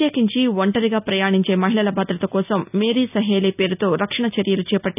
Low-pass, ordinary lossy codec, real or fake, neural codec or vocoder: 3.6 kHz; none; real; none